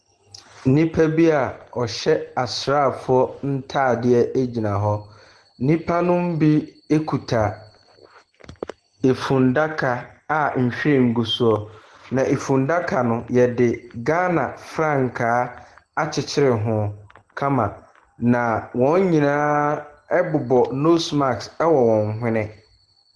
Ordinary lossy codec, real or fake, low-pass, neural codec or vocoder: Opus, 16 kbps; real; 10.8 kHz; none